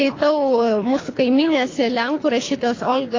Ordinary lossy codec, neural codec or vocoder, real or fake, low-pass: AAC, 32 kbps; codec, 24 kHz, 3 kbps, HILCodec; fake; 7.2 kHz